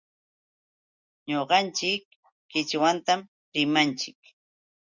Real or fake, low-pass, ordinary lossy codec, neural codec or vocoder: real; 7.2 kHz; Opus, 64 kbps; none